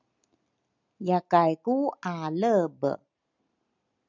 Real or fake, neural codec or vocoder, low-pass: real; none; 7.2 kHz